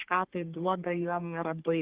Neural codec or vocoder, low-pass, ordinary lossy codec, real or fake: codec, 44.1 kHz, 3.4 kbps, Pupu-Codec; 3.6 kHz; Opus, 16 kbps; fake